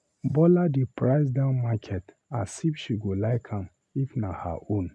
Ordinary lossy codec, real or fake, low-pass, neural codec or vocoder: none; real; none; none